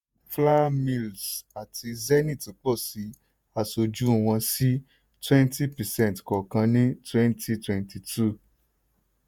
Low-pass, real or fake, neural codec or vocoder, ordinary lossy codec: none; fake; vocoder, 48 kHz, 128 mel bands, Vocos; none